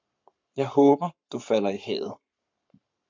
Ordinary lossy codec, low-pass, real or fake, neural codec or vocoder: MP3, 64 kbps; 7.2 kHz; fake; codec, 44.1 kHz, 7.8 kbps, Pupu-Codec